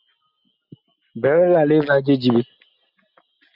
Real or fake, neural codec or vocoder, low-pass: real; none; 5.4 kHz